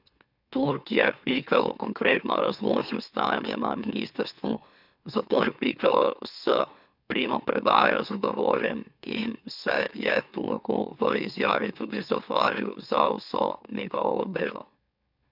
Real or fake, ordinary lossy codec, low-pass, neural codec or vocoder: fake; none; 5.4 kHz; autoencoder, 44.1 kHz, a latent of 192 numbers a frame, MeloTTS